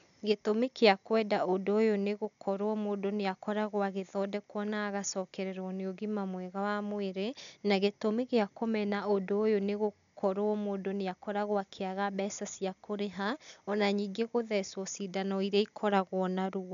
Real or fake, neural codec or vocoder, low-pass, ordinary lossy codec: real; none; 7.2 kHz; none